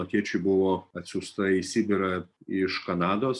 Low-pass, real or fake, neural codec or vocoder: 10.8 kHz; real; none